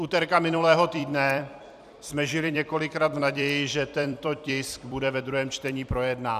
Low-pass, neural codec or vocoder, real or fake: 14.4 kHz; vocoder, 44.1 kHz, 128 mel bands every 256 samples, BigVGAN v2; fake